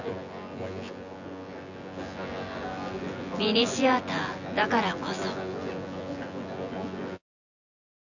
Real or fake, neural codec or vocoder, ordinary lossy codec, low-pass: fake; vocoder, 24 kHz, 100 mel bands, Vocos; none; 7.2 kHz